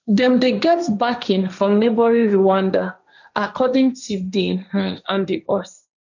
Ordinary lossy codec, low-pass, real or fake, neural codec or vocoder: none; 7.2 kHz; fake; codec, 16 kHz, 1.1 kbps, Voila-Tokenizer